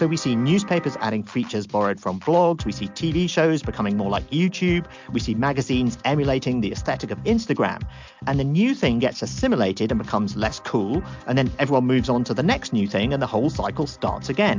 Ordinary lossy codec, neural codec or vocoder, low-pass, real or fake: MP3, 64 kbps; none; 7.2 kHz; real